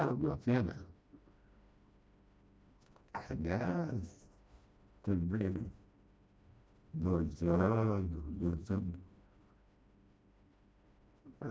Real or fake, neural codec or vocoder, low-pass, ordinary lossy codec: fake; codec, 16 kHz, 1 kbps, FreqCodec, smaller model; none; none